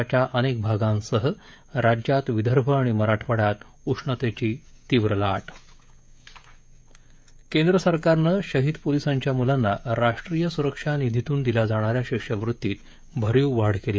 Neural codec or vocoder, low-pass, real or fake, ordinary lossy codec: codec, 16 kHz, 16 kbps, FreqCodec, smaller model; none; fake; none